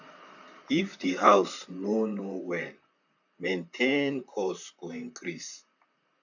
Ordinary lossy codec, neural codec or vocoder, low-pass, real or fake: none; vocoder, 44.1 kHz, 128 mel bands, Pupu-Vocoder; 7.2 kHz; fake